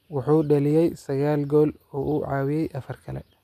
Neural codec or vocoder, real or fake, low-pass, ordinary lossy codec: none; real; 14.4 kHz; none